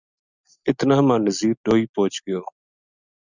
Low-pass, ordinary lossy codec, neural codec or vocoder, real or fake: 7.2 kHz; Opus, 64 kbps; none; real